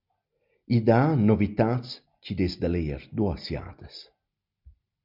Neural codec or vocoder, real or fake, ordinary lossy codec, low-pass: none; real; MP3, 48 kbps; 5.4 kHz